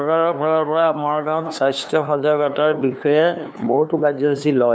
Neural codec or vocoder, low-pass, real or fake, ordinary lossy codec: codec, 16 kHz, 2 kbps, FunCodec, trained on LibriTTS, 25 frames a second; none; fake; none